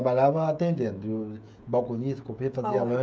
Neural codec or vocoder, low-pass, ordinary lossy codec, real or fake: codec, 16 kHz, 16 kbps, FreqCodec, smaller model; none; none; fake